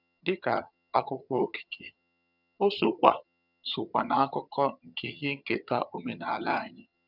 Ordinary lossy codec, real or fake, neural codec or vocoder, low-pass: none; fake; vocoder, 22.05 kHz, 80 mel bands, HiFi-GAN; 5.4 kHz